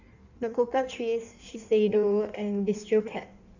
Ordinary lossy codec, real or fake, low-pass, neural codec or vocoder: none; fake; 7.2 kHz; codec, 16 kHz in and 24 kHz out, 1.1 kbps, FireRedTTS-2 codec